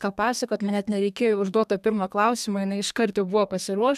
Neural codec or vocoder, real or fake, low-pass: codec, 32 kHz, 1.9 kbps, SNAC; fake; 14.4 kHz